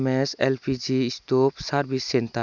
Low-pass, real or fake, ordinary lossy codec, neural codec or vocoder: 7.2 kHz; real; none; none